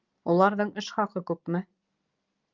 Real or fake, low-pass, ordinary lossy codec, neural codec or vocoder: real; 7.2 kHz; Opus, 32 kbps; none